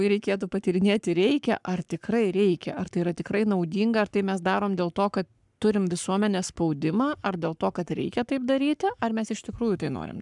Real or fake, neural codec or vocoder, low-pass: fake; codec, 44.1 kHz, 7.8 kbps, Pupu-Codec; 10.8 kHz